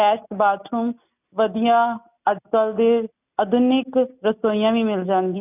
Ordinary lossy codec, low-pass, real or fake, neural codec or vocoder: none; 3.6 kHz; real; none